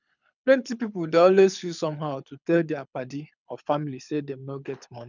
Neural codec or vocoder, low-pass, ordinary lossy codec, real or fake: codec, 24 kHz, 6 kbps, HILCodec; 7.2 kHz; none; fake